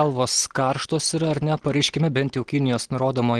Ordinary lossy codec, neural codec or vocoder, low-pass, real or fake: Opus, 16 kbps; none; 9.9 kHz; real